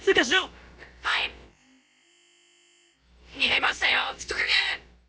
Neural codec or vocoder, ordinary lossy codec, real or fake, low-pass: codec, 16 kHz, about 1 kbps, DyCAST, with the encoder's durations; none; fake; none